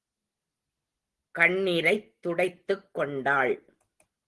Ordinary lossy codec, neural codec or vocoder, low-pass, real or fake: Opus, 16 kbps; none; 10.8 kHz; real